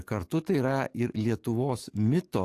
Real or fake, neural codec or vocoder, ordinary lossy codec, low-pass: fake; codec, 44.1 kHz, 7.8 kbps, DAC; AAC, 64 kbps; 14.4 kHz